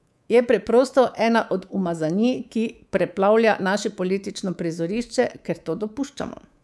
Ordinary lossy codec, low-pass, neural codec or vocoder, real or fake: none; none; codec, 24 kHz, 3.1 kbps, DualCodec; fake